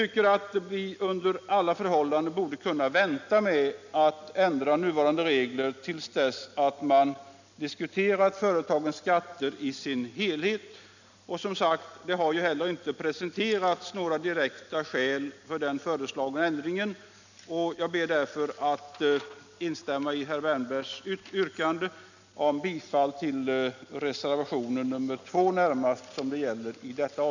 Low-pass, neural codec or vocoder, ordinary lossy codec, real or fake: 7.2 kHz; none; none; real